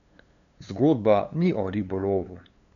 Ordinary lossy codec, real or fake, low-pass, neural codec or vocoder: none; fake; 7.2 kHz; codec, 16 kHz, 2 kbps, FunCodec, trained on LibriTTS, 25 frames a second